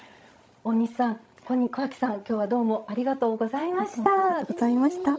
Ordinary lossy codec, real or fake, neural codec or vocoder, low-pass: none; fake; codec, 16 kHz, 16 kbps, FreqCodec, larger model; none